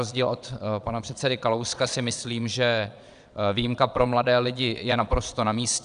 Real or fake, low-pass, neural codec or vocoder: fake; 9.9 kHz; vocoder, 22.05 kHz, 80 mel bands, Vocos